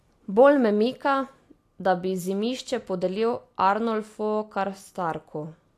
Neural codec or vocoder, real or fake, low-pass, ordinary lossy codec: none; real; 14.4 kHz; AAC, 64 kbps